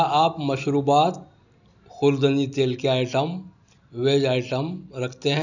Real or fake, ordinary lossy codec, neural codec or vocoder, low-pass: real; none; none; 7.2 kHz